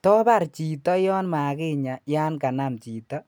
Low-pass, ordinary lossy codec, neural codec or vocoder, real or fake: none; none; none; real